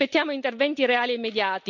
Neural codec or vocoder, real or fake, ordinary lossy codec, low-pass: none; real; none; 7.2 kHz